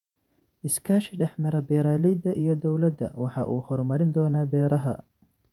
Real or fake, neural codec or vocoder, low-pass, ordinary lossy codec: fake; vocoder, 48 kHz, 128 mel bands, Vocos; 19.8 kHz; none